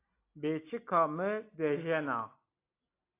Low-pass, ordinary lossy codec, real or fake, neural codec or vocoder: 3.6 kHz; AAC, 24 kbps; real; none